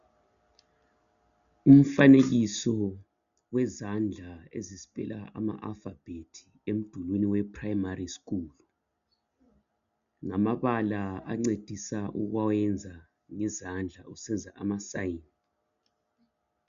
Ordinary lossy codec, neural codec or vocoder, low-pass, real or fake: MP3, 96 kbps; none; 7.2 kHz; real